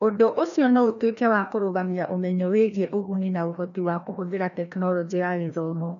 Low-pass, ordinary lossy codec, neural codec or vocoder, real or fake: 7.2 kHz; none; codec, 16 kHz, 1 kbps, FreqCodec, larger model; fake